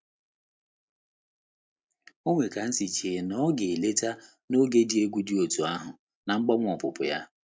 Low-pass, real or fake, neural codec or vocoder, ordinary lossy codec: none; real; none; none